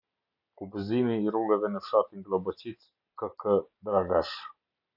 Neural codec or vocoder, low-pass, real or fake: none; 5.4 kHz; real